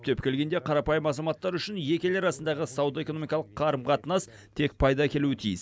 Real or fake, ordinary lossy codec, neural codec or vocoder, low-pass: real; none; none; none